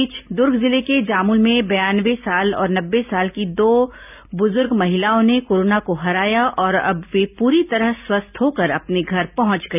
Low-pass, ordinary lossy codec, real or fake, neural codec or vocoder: 3.6 kHz; none; real; none